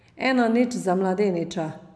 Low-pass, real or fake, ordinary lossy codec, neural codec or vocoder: none; real; none; none